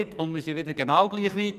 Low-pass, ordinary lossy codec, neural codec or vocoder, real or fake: 14.4 kHz; none; codec, 44.1 kHz, 2.6 kbps, SNAC; fake